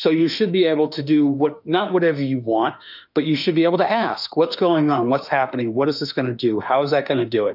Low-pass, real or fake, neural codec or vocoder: 5.4 kHz; fake; autoencoder, 48 kHz, 32 numbers a frame, DAC-VAE, trained on Japanese speech